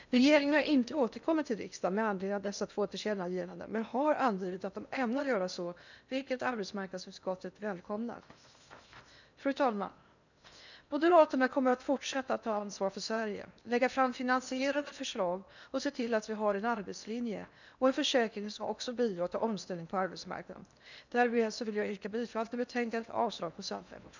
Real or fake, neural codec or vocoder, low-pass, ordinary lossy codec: fake; codec, 16 kHz in and 24 kHz out, 0.8 kbps, FocalCodec, streaming, 65536 codes; 7.2 kHz; none